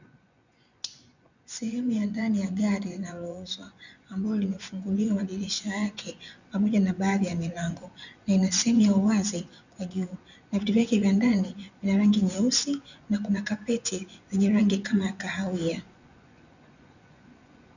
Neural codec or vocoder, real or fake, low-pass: vocoder, 24 kHz, 100 mel bands, Vocos; fake; 7.2 kHz